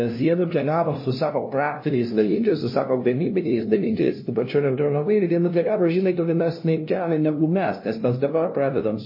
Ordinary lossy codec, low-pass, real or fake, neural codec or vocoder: MP3, 24 kbps; 5.4 kHz; fake; codec, 16 kHz, 0.5 kbps, FunCodec, trained on LibriTTS, 25 frames a second